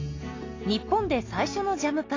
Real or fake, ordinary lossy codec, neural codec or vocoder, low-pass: real; AAC, 32 kbps; none; 7.2 kHz